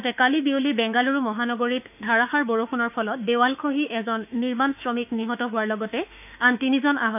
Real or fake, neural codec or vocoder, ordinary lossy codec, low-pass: fake; autoencoder, 48 kHz, 32 numbers a frame, DAC-VAE, trained on Japanese speech; none; 3.6 kHz